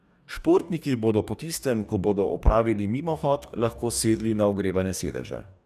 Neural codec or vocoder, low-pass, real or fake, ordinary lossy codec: codec, 44.1 kHz, 2.6 kbps, DAC; 14.4 kHz; fake; none